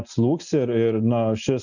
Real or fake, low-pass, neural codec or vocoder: real; 7.2 kHz; none